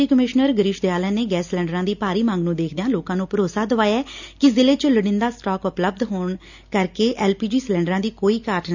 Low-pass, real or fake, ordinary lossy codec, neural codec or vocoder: 7.2 kHz; real; none; none